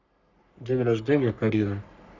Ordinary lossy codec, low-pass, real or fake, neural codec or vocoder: none; 7.2 kHz; fake; codec, 44.1 kHz, 3.4 kbps, Pupu-Codec